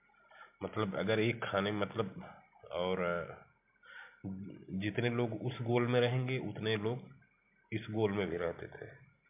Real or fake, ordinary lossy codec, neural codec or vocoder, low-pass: real; MP3, 32 kbps; none; 3.6 kHz